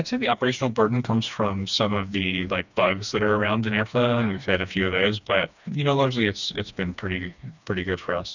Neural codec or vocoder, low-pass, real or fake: codec, 16 kHz, 2 kbps, FreqCodec, smaller model; 7.2 kHz; fake